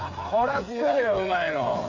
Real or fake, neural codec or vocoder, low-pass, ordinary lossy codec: fake; codec, 16 kHz, 8 kbps, FreqCodec, smaller model; 7.2 kHz; none